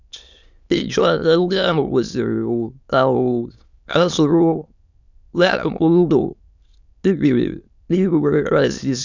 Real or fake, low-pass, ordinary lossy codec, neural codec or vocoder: fake; 7.2 kHz; none; autoencoder, 22.05 kHz, a latent of 192 numbers a frame, VITS, trained on many speakers